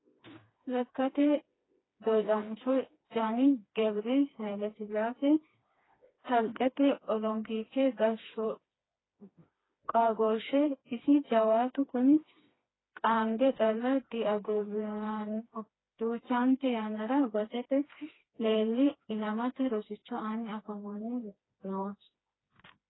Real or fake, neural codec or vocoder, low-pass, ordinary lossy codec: fake; codec, 16 kHz, 2 kbps, FreqCodec, smaller model; 7.2 kHz; AAC, 16 kbps